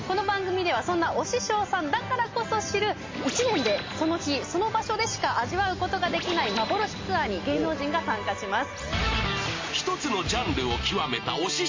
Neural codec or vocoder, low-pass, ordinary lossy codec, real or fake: none; 7.2 kHz; MP3, 32 kbps; real